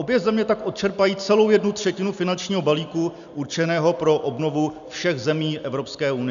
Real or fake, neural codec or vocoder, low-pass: real; none; 7.2 kHz